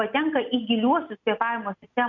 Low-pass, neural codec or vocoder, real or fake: 7.2 kHz; none; real